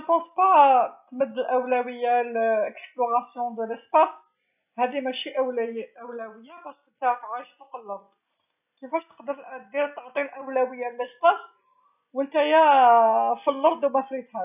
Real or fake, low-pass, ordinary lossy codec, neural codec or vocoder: real; 3.6 kHz; none; none